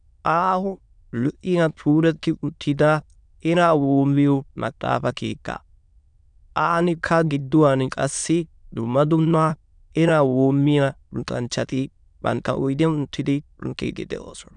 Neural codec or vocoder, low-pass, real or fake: autoencoder, 22.05 kHz, a latent of 192 numbers a frame, VITS, trained on many speakers; 9.9 kHz; fake